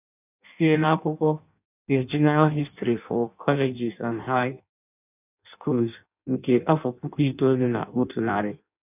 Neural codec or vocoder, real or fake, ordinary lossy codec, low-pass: codec, 16 kHz in and 24 kHz out, 0.6 kbps, FireRedTTS-2 codec; fake; none; 3.6 kHz